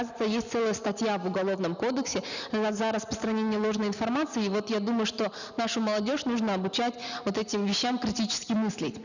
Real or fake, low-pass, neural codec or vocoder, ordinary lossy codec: real; 7.2 kHz; none; none